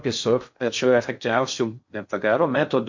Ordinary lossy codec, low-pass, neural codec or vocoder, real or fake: MP3, 48 kbps; 7.2 kHz; codec, 16 kHz in and 24 kHz out, 0.6 kbps, FocalCodec, streaming, 4096 codes; fake